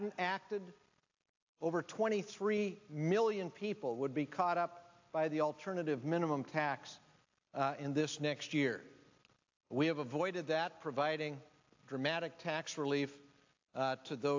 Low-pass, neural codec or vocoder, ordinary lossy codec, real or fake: 7.2 kHz; none; AAC, 48 kbps; real